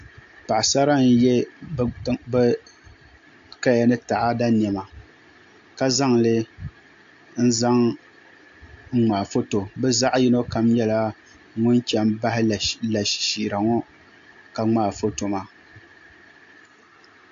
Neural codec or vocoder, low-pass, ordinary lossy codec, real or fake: none; 7.2 kHz; AAC, 64 kbps; real